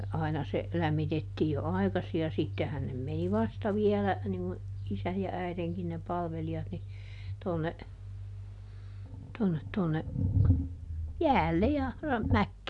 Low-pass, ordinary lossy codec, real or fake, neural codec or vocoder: 10.8 kHz; none; real; none